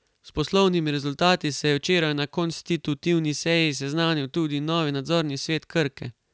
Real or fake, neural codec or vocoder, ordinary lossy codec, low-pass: real; none; none; none